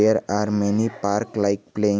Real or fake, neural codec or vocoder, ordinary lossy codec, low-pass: real; none; none; none